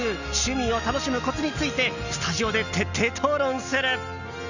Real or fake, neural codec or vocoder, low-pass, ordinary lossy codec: real; none; 7.2 kHz; none